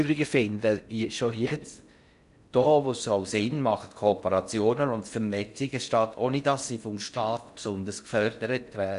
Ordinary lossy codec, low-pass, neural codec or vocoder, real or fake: none; 10.8 kHz; codec, 16 kHz in and 24 kHz out, 0.6 kbps, FocalCodec, streaming, 4096 codes; fake